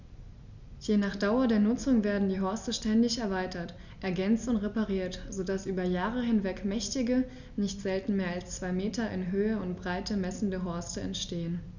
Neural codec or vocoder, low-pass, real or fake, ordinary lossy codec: none; 7.2 kHz; real; none